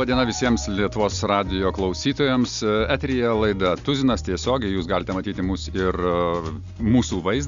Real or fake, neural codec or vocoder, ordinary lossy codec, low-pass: real; none; Opus, 64 kbps; 7.2 kHz